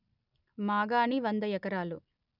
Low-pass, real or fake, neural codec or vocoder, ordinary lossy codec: 5.4 kHz; real; none; none